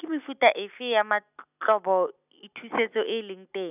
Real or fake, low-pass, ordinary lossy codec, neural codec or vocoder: real; 3.6 kHz; none; none